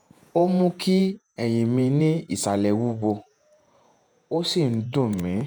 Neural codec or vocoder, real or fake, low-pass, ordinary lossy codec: vocoder, 48 kHz, 128 mel bands, Vocos; fake; none; none